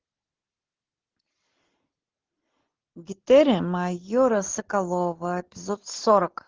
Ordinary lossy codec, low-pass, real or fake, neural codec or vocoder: Opus, 16 kbps; 7.2 kHz; real; none